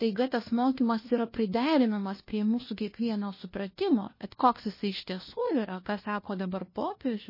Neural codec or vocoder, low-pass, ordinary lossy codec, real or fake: codec, 16 kHz, 1 kbps, FunCodec, trained on LibriTTS, 50 frames a second; 5.4 kHz; MP3, 24 kbps; fake